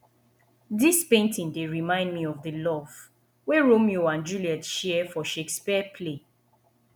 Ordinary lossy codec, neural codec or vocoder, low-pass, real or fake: none; none; none; real